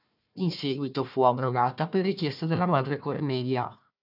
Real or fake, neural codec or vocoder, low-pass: fake; codec, 16 kHz, 1 kbps, FunCodec, trained on Chinese and English, 50 frames a second; 5.4 kHz